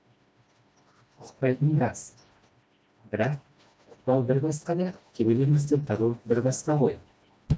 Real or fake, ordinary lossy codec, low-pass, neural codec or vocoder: fake; none; none; codec, 16 kHz, 1 kbps, FreqCodec, smaller model